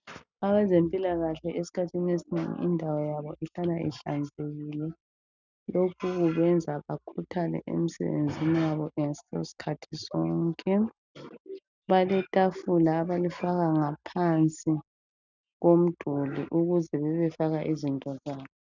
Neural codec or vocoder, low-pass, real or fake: none; 7.2 kHz; real